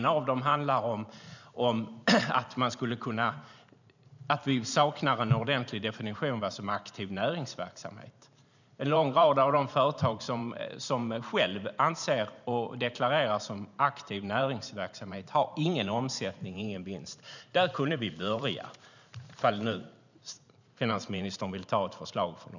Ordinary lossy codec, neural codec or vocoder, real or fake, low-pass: none; vocoder, 44.1 kHz, 128 mel bands every 512 samples, BigVGAN v2; fake; 7.2 kHz